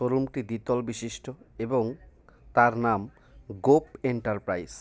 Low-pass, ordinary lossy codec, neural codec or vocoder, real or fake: none; none; none; real